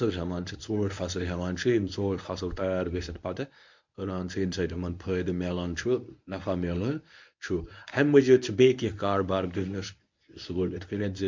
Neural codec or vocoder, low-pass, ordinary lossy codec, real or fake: codec, 24 kHz, 0.9 kbps, WavTokenizer, medium speech release version 1; 7.2 kHz; MP3, 64 kbps; fake